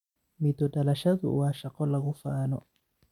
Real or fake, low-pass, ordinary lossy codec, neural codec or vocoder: real; 19.8 kHz; none; none